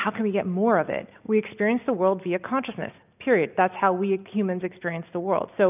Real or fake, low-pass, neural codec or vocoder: real; 3.6 kHz; none